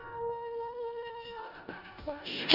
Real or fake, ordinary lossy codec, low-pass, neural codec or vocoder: fake; none; 5.4 kHz; codec, 16 kHz in and 24 kHz out, 0.4 kbps, LongCat-Audio-Codec, four codebook decoder